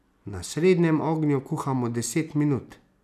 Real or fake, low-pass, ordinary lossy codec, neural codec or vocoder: real; 14.4 kHz; none; none